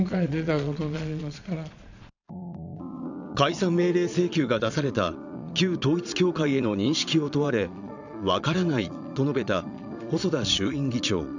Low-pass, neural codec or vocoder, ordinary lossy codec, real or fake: 7.2 kHz; vocoder, 22.05 kHz, 80 mel bands, Vocos; none; fake